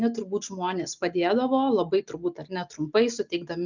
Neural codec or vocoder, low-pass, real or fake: none; 7.2 kHz; real